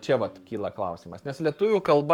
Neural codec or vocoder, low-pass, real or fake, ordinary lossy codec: codec, 44.1 kHz, 7.8 kbps, Pupu-Codec; 19.8 kHz; fake; MP3, 96 kbps